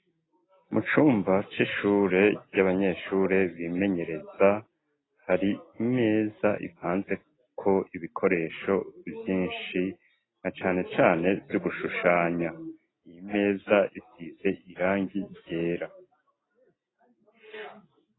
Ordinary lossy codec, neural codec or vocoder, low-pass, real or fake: AAC, 16 kbps; none; 7.2 kHz; real